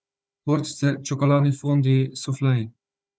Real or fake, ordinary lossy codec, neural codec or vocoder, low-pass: fake; none; codec, 16 kHz, 4 kbps, FunCodec, trained on Chinese and English, 50 frames a second; none